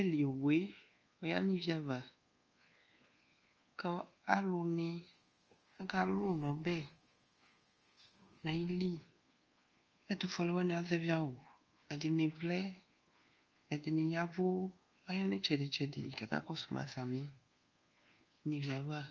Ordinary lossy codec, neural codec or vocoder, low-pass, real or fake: Opus, 24 kbps; codec, 24 kHz, 1.2 kbps, DualCodec; 7.2 kHz; fake